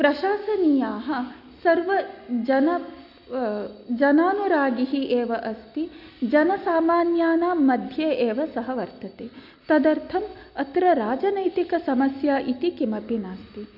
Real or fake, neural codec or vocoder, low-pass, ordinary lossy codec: real; none; 5.4 kHz; none